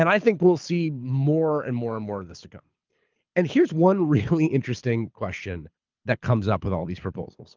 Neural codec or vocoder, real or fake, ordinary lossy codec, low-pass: codec, 24 kHz, 6 kbps, HILCodec; fake; Opus, 24 kbps; 7.2 kHz